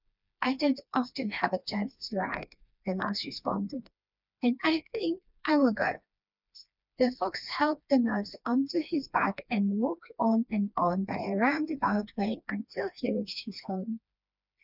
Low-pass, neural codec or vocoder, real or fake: 5.4 kHz; codec, 16 kHz, 2 kbps, FreqCodec, smaller model; fake